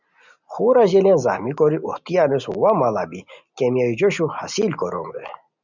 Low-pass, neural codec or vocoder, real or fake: 7.2 kHz; none; real